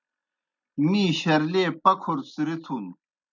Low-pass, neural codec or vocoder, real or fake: 7.2 kHz; none; real